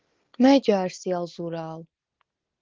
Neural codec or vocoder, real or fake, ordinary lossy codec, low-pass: none; real; Opus, 16 kbps; 7.2 kHz